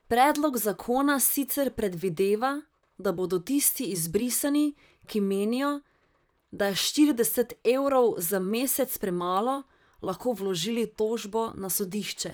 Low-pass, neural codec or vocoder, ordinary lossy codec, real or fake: none; vocoder, 44.1 kHz, 128 mel bands, Pupu-Vocoder; none; fake